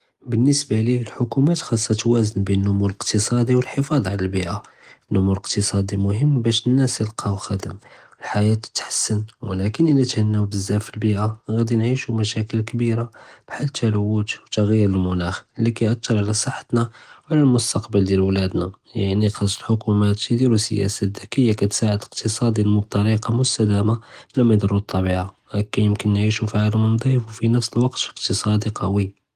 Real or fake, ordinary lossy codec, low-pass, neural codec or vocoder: real; Opus, 24 kbps; 14.4 kHz; none